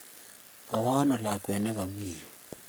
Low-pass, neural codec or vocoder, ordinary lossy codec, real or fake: none; codec, 44.1 kHz, 3.4 kbps, Pupu-Codec; none; fake